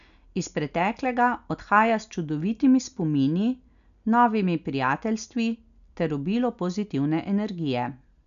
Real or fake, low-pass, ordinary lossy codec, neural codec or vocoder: real; 7.2 kHz; none; none